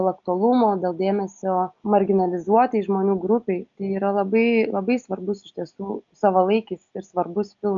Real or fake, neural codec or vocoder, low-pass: real; none; 7.2 kHz